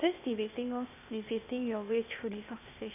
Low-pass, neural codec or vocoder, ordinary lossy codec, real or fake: 3.6 kHz; codec, 16 kHz, 0.8 kbps, ZipCodec; none; fake